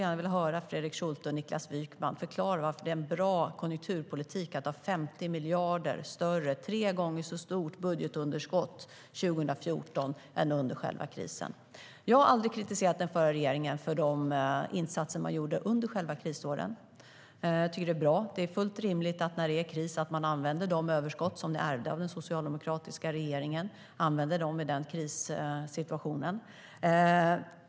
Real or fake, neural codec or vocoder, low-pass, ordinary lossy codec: real; none; none; none